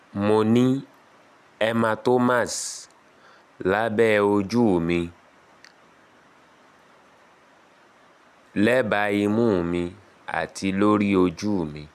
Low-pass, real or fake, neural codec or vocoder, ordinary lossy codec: 14.4 kHz; real; none; none